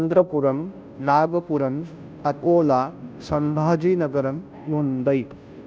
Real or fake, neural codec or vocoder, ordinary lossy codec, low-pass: fake; codec, 16 kHz, 0.5 kbps, FunCodec, trained on Chinese and English, 25 frames a second; none; none